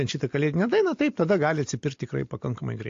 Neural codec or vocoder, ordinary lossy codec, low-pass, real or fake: none; AAC, 48 kbps; 7.2 kHz; real